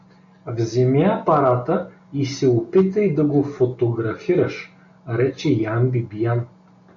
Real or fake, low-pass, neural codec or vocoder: real; 7.2 kHz; none